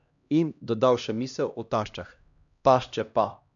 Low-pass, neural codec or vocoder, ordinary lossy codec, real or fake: 7.2 kHz; codec, 16 kHz, 1 kbps, X-Codec, HuBERT features, trained on LibriSpeech; none; fake